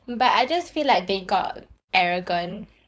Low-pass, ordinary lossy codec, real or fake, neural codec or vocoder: none; none; fake; codec, 16 kHz, 4.8 kbps, FACodec